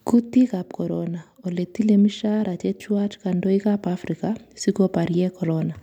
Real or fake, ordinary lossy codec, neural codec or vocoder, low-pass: real; MP3, 96 kbps; none; 19.8 kHz